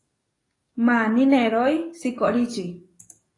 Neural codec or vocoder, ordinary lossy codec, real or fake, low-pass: codec, 44.1 kHz, 7.8 kbps, DAC; AAC, 32 kbps; fake; 10.8 kHz